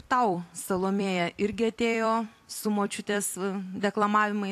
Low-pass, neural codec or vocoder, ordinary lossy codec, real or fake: 14.4 kHz; vocoder, 44.1 kHz, 128 mel bands every 256 samples, BigVGAN v2; AAC, 64 kbps; fake